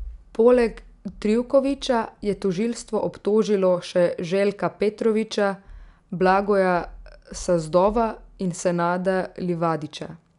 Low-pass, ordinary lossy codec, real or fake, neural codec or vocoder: 10.8 kHz; none; real; none